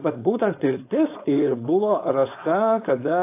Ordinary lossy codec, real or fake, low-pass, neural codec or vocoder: AAC, 24 kbps; fake; 3.6 kHz; codec, 16 kHz, 4.8 kbps, FACodec